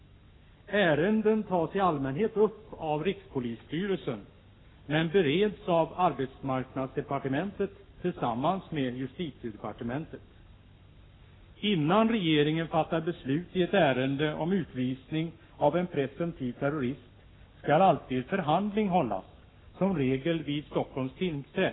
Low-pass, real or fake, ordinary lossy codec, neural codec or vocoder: 7.2 kHz; fake; AAC, 16 kbps; codec, 44.1 kHz, 7.8 kbps, Pupu-Codec